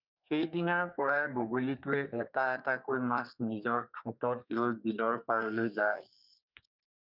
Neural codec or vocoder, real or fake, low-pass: codec, 32 kHz, 1.9 kbps, SNAC; fake; 5.4 kHz